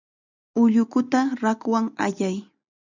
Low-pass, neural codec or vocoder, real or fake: 7.2 kHz; none; real